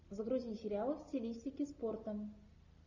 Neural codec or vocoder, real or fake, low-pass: none; real; 7.2 kHz